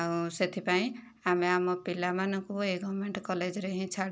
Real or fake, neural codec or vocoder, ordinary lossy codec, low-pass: real; none; none; none